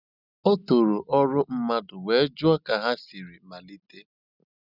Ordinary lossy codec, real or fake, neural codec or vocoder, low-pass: none; real; none; 5.4 kHz